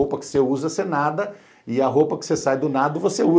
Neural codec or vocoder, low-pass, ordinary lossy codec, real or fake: none; none; none; real